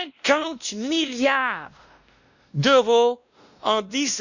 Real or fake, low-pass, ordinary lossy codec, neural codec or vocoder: fake; 7.2 kHz; none; codec, 16 kHz, 1 kbps, X-Codec, WavLM features, trained on Multilingual LibriSpeech